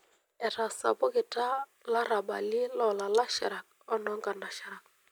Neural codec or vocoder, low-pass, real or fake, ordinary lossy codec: none; none; real; none